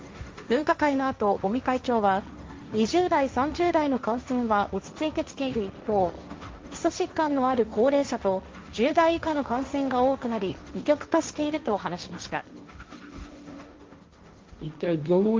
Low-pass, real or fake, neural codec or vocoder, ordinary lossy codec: 7.2 kHz; fake; codec, 16 kHz, 1.1 kbps, Voila-Tokenizer; Opus, 32 kbps